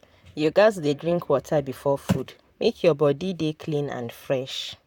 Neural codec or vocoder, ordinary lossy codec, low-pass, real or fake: vocoder, 44.1 kHz, 128 mel bands, Pupu-Vocoder; none; 19.8 kHz; fake